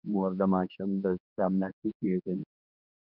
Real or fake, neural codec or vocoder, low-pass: fake; codec, 16 kHz, 2 kbps, X-Codec, HuBERT features, trained on general audio; 5.4 kHz